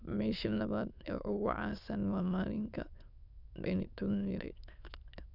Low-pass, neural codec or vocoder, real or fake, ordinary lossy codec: 5.4 kHz; autoencoder, 22.05 kHz, a latent of 192 numbers a frame, VITS, trained on many speakers; fake; none